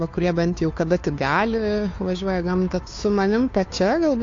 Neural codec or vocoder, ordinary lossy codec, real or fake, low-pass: codec, 16 kHz, 2 kbps, FunCodec, trained on Chinese and English, 25 frames a second; AAC, 48 kbps; fake; 7.2 kHz